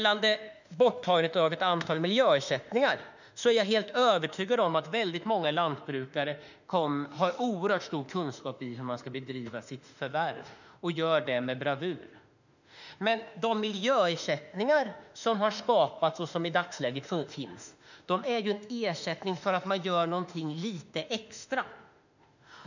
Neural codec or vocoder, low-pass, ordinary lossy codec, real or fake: autoencoder, 48 kHz, 32 numbers a frame, DAC-VAE, trained on Japanese speech; 7.2 kHz; none; fake